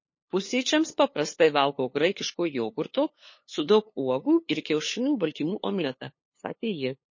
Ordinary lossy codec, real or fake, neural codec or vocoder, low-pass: MP3, 32 kbps; fake; codec, 16 kHz, 2 kbps, FunCodec, trained on LibriTTS, 25 frames a second; 7.2 kHz